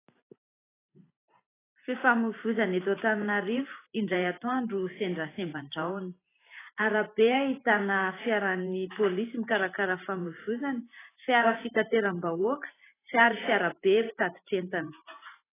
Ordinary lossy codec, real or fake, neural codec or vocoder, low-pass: AAC, 16 kbps; fake; vocoder, 44.1 kHz, 128 mel bands every 512 samples, BigVGAN v2; 3.6 kHz